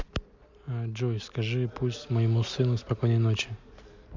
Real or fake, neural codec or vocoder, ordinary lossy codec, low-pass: real; none; MP3, 64 kbps; 7.2 kHz